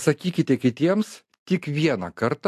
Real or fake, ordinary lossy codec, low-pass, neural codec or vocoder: real; AAC, 64 kbps; 14.4 kHz; none